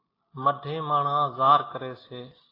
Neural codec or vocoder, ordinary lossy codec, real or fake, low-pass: none; AAC, 24 kbps; real; 5.4 kHz